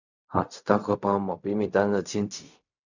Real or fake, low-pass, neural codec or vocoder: fake; 7.2 kHz; codec, 16 kHz in and 24 kHz out, 0.4 kbps, LongCat-Audio-Codec, fine tuned four codebook decoder